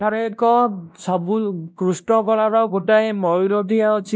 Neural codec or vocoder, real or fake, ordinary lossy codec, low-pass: codec, 16 kHz, 1 kbps, X-Codec, WavLM features, trained on Multilingual LibriSpeech; fake; none; none